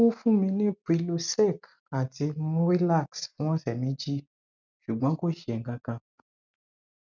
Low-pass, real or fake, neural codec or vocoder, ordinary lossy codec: 7.2 kHz; real; none; none